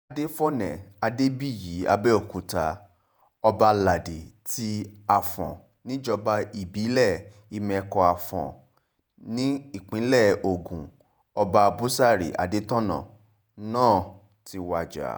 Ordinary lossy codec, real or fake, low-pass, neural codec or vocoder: none; fake; none; vocoder, 48 kHz, 128 mel bands, Vocos